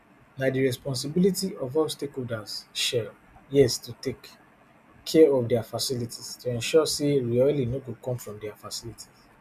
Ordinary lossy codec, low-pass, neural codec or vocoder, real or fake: none; 14.4 kHz; none; real